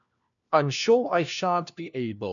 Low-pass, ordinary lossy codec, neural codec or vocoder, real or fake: 7.2 kHz; none; codec, 16 kHz, 1 kbps, FunCodec, trained on LibriTTS, 50 frames a second; fake